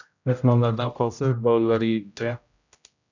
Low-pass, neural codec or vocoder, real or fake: 7.2 kHz; codec, 16 kHz, 0.5 kbps, X-Codec, HuBERT features, trained on general audio; fake